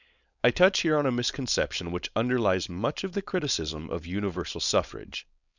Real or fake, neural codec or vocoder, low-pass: fake; codec, 16 kHz, 4.8 kbps, FACodec; 7.2 kHz